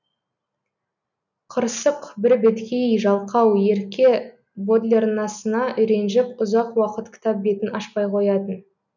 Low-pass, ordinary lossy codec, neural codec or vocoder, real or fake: 7.2 kHz; none; none; real